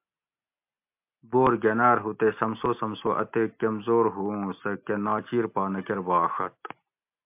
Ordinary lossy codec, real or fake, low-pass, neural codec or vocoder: MP3, 32 kbps; real; 3.6 kHz; none